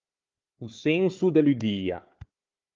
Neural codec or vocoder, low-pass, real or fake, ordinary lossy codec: codec, 16 kHz, 4 kbps, FunCodec, trained on Chinese and English, 50 frames a second; 7.2 kHz; fake; Opus, 32 kbps